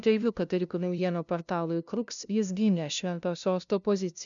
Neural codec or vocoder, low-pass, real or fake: codec, 16 kHz, 0.5 kbps, FunCodec, trained on LibriTTS, 25 frames a second; 7.2 kHz; fake